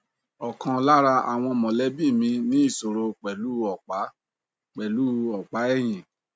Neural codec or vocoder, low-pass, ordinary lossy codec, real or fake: none; none; none; real